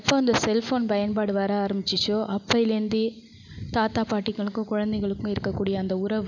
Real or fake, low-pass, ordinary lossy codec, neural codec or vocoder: real; 7.2 kHz; none; none